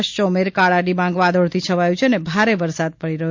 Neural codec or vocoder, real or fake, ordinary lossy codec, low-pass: none; real; MP3, 48 kbps; 7.2 kHz